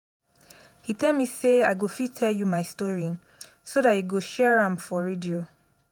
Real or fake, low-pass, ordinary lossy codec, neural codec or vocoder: fake; none; none; vocoder, 48 kHz, 128 mel bands, Vocos